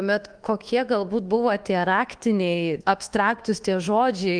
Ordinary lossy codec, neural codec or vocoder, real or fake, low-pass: Opus, 24 kbps; codec, 24 kHz, 1.2 kbps, DualCodec; fake; 9.9 kHz